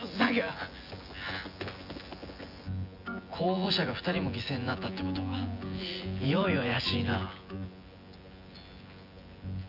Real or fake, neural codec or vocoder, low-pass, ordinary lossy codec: fake; vocoder, 24 kHz, 100 mel bands, Vocos; 5.4 kHz; none